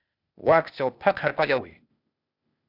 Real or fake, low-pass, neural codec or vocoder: fake; 5.4 kHz; codec, 16 kHz, 0.8 kbps, ZipCodec